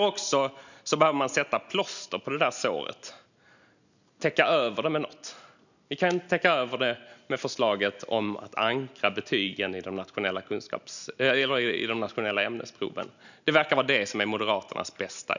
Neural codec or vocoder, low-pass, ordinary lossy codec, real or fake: none; 7.2 kHz; none; real